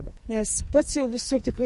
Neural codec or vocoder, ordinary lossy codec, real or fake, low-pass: codec, 44.1 kHz, 2.6 kbps, SNAC; MP3, 48 kbps; fake; 14.4 kHz